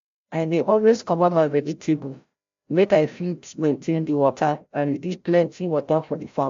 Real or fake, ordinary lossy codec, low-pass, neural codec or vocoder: fake; AAC, 64 kbps; 7.2 kHz; codec, 16 kHz, 0.5 kbps, FreqCodec, larger model